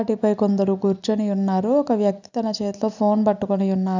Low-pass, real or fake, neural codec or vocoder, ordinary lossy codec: 7.2 kHz; real; none; none